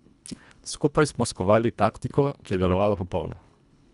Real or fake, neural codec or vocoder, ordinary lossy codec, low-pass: fake; codec, 24 kHz, 1.5 kbps, HILCodec; none; 10.8 kHz